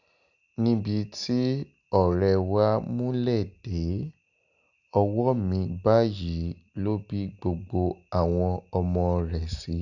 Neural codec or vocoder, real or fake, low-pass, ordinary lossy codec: none; real; 7.2 kHz; none